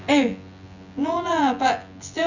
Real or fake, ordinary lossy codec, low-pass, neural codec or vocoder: fake; none; 7.2 kHz; vocoder, 24 kHz, 100 mel bands, Vocos